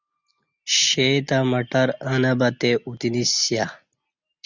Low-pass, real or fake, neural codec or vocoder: 7.2 kHz; real; none